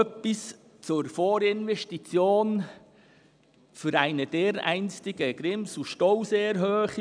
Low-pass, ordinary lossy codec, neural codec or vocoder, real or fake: 9.9 kHz; none; none; real